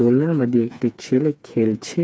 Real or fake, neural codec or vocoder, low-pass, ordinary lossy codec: fake; codec, 16 kHz, 4 kbps, FreqCodec, smaller model; none; none